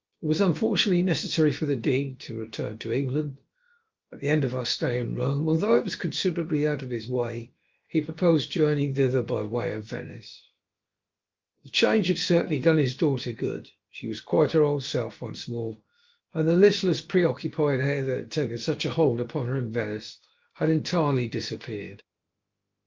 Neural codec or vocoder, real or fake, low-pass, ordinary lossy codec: codec, 16 kHz, about 1 kbps, DyCAST, with the encoder's durations; fake; 7.2 kHz; Opus, 32 kbps